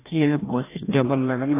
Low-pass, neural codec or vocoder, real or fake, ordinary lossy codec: 3.6 kHz; codec, 16 kHz, 1 kbps, FreqCodec, larger model; fake; AAC, 16 kbps